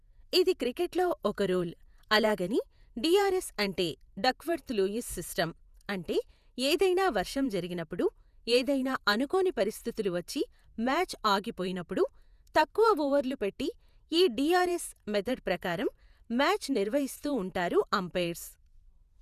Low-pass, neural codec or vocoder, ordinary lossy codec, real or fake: 14.4 kHz; vocoder, 48 kHz, 128 mel bands, Vocos; none; fake